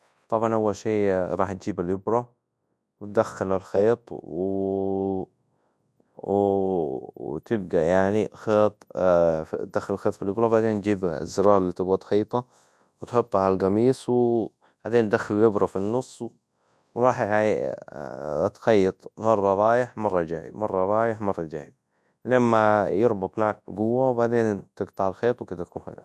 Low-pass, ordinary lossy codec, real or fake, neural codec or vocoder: none; none; fake; codec, 24 kHz, 0.9 kbps, WavTokenizer, large speech release